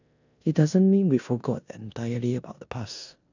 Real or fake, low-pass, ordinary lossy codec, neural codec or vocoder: fake; 7.2 kHz; none; codec, 16 kHz in and 24 kHz out, 0.9 kbps, LongCat-Audio-Codec, four codebook decoder